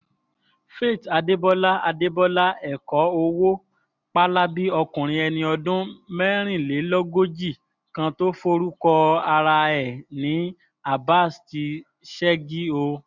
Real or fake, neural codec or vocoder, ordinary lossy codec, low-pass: real; none; none; 7.2 kHz